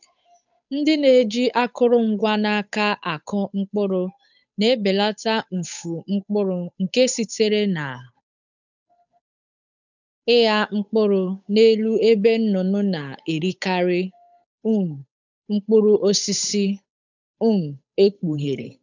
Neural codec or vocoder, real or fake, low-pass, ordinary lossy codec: codec, 16 kHz, 8 kbps, FunCodec, trained on Chinese and English, 25 frames a second; fake; 7.2 kHz; none